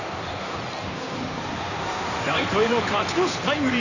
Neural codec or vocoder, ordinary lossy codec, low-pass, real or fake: codec, 16 kHz in and 24 kHz out, 2.2 kbps, FireRedTTS-2 codec; none; 7.2 kHz; fake